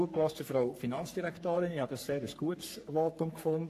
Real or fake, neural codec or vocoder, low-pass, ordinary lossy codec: fake; codec, 44.1 kHz, 3.4 kbps, Pupu-Codec; 14.4 kHz; AAC, 64 kbps